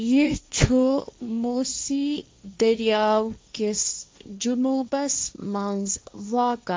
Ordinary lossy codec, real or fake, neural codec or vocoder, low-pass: none; fake; codec, 16 kHz, 1.1 kbps, Voila-Tokenizer; none